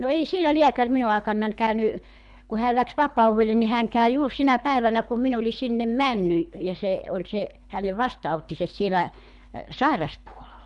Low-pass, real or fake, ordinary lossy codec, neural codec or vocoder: 10.8 kHz; fake; none; codec, 24 kHz, 3 kbps, HILCodec